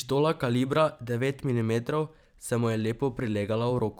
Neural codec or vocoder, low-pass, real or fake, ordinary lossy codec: vocoder, 44.1 kHz, 128 mel bands every 512 samples, BigVGAN v2; 19.8 kHz; fake; none